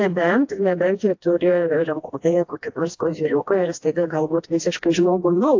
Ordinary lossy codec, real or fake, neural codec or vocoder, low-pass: AAC, 48 kbps; fake; codec, 16 kHz, 1 kbps, FreqCodec, smaller model; 7.2 kHz